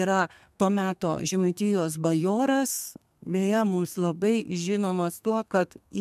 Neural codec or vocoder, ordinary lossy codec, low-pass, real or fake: codec, 32 kHz, 1.9 kbps, SNAC; MP3, 96 kbps; 14.4 kHz; fake